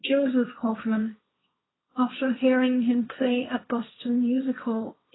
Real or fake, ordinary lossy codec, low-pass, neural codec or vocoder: fake; AAC, 16 kbps; 7.2 kHz; codec, 16 kHz, 1.1 kbps, Voila-Tokenizer